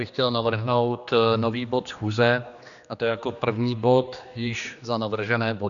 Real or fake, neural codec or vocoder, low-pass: fake; codec, 16 kHz, 2 kbps, X-Codec, HuBERT features, trained on general audio; 7.2 kHz